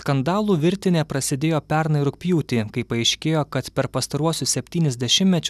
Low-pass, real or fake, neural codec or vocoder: 14.4 kHz; real; none